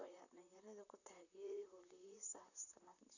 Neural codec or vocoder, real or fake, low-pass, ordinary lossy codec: vocoder, 44.1 kHz, 128 mel bands, Pupu-Vocoder; fake; 7.2 kHz; none